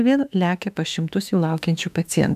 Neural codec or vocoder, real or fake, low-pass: autoencoder, 48 kHz, 32 numbers a frame, DAC-VAE, trained on Japanese speech; fake; 14.4 kHz